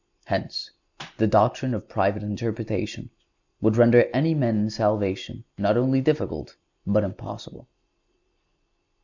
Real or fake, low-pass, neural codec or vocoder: real; 7.2 kHz; none